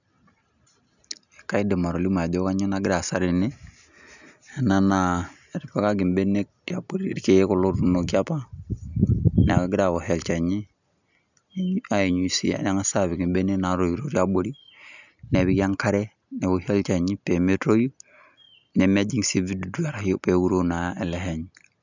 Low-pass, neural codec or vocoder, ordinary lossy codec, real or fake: 7.2 kHz; none; none; real